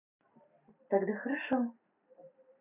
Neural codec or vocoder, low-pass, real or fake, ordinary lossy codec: none; 3.6 kHz; real; none